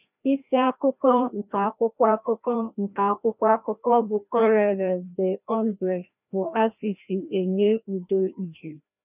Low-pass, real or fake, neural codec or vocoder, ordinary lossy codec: 3.6 kHz; fake; codec, 16 kHz, 1 kbps, FreqCodec, larger model; none